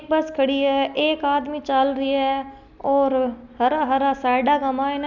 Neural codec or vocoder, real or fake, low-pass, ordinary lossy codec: none; real; 7.2 kHz; none